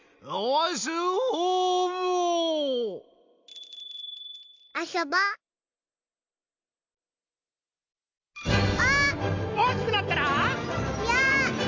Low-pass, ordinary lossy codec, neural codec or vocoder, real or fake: 7.2 kHz; MP3, 64 kbps; none; real